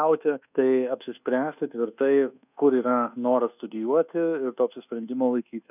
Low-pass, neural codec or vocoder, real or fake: 3.6 kHz; codec, 24 kHz, 1.2 kbps, DualCodec; fake